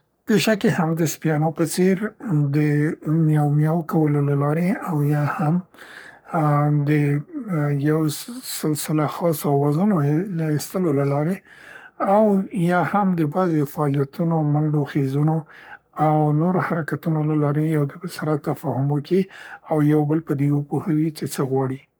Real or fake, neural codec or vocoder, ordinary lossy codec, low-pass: fake; codec, 44.1 kHz, 3.4 kbps, Pupu-Codec; none; none